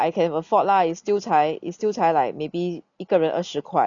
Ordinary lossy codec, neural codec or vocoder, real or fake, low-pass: none; none; real; 7.2 kHz